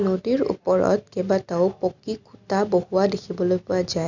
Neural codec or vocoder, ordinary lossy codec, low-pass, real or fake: none; none; 7.2 kHz; real